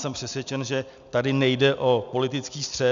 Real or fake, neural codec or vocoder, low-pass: real; none; 7.2 kHz